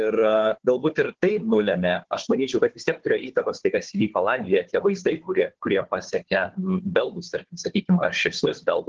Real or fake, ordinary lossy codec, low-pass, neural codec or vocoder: fake; Opus, 16 kbps; 7.2 kHz; codec, 16 kHz, 4 kbps, FunCodec, trained on Chinese and English, 50 frames a second